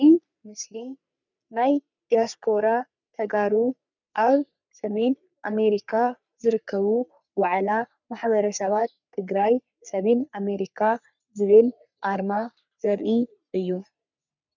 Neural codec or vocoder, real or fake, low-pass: codec, 44.1 kHz, 3.4 kbps, Pupu-Codec; fake; 7.2 kHz